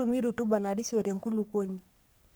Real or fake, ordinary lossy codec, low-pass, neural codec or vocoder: fake; none; none; codec, 44.1 kHz, 3.4 kbps, Pupu-Codec